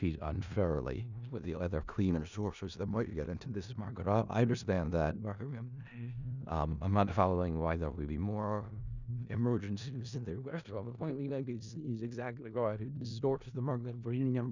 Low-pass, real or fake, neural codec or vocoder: 7.2 kHz; fake; codec, 16 kHz in and 24 kHz out, 0.4 kbps, LongCat-Audio-Codec, four codebook decoder